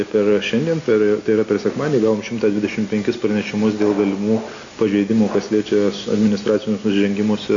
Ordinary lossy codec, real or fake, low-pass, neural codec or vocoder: AAC, 48 kbps; real; 7.2 kHz; none